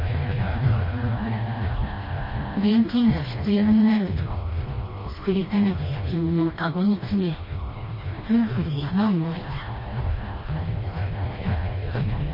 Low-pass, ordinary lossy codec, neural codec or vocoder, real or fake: 5.4 kHz; MP3, 24 kbps; codec, 16 kHz, 1 kbps, FreqCodec, smaller model; fake